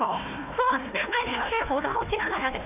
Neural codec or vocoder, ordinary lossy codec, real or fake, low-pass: codec, 16 kHz, 1 kbps, FunCodec, trained on Chinese and English, 50 frames a second; none; fake; 3.6 kHz